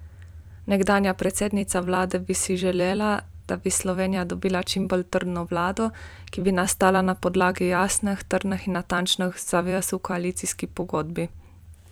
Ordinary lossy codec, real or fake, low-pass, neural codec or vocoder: none; fake; none; vocoder, 44.1 kHz, 128 mel bands every 256 samples, BigVGAN v2